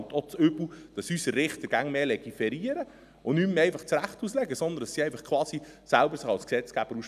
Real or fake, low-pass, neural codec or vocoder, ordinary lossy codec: real; 14.4 kHz; none; none